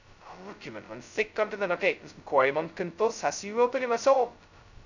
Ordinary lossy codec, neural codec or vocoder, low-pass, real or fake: none; codec, 16 kHz, 0.2 kbps, FocalCodec; 7.2 kHz; fake